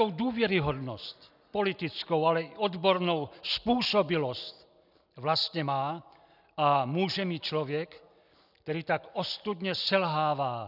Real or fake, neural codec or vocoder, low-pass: real; none; 5.4 kHz